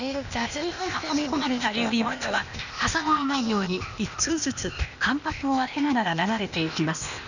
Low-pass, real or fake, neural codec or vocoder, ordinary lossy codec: 7.2 kHz; fake; codec, 16 kHz, 0.8 kbps, ZipCodec; none